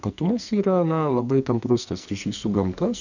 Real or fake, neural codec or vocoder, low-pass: fake; codec, 44.1 kHz, 2.6 kbps, SNAC; 7.2 kHz